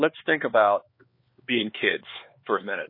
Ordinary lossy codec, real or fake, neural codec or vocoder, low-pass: MP3, 24 kbps; fake; codec, 16 kHz, 4 kbps, X-Codec, HuBERT features, trained on LibriSpeech; 5.4 kHz